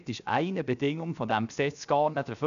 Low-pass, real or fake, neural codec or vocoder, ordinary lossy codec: 7.2 kHz; fake; codec, 16 kHz, 0.7 kbps, FocalCodec; none